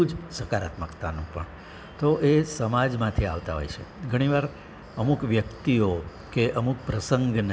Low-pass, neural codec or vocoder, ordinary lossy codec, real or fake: none; none; none; real